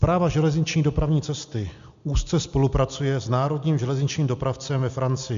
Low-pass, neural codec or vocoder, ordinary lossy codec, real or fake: 7.2 kHz; none; MP3, 64 kbps; real